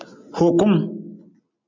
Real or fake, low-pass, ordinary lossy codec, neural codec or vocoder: real; 7.2 kHz; MP3, 48 kbps; none